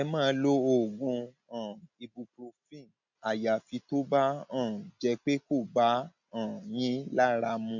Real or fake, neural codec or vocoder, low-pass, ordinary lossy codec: real; none; 7.2 kHz; none